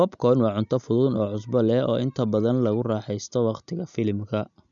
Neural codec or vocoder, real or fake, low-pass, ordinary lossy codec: none; real; 7.2 kHz; none